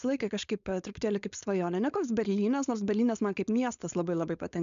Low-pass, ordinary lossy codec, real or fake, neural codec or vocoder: 7.2 kHz; AAC, 96 kbps; fake; codec, 16 kHz, 4.8 kbps, FACodec